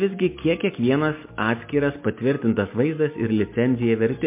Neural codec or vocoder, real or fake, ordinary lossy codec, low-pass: none; real; MP3, 24 kbps; 3.6 kHz